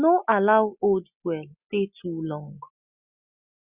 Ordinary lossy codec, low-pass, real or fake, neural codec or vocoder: Opus, 64 kbps; 3.6 kHz; real; none